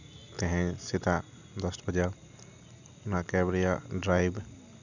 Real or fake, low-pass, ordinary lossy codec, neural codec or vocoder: real; 7.2 kHz; none; none